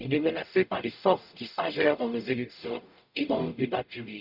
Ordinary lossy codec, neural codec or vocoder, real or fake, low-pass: none; codec, 44.1 kHz, 0.9 kbps, DAC; fake; 5.4 kHz